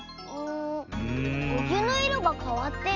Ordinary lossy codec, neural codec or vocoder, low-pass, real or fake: none; none; 7.2 kHz; real